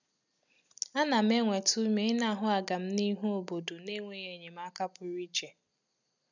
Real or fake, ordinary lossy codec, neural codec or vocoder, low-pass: real; none; none; 7.2 kHz